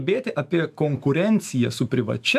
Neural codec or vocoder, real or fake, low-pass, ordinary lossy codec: autoencoder, 48 kHz, 128 numbers a frame, DAC-VAE, trained on Japanese speech; fake; 14.4 kHz; AAC, 96 kbps